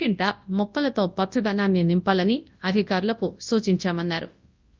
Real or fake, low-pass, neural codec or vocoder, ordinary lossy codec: fake; 7.2 kHz; codec, 24 kHz, 0.9 kbps, WavTokenizer, large speech release; Opus, 24 kbps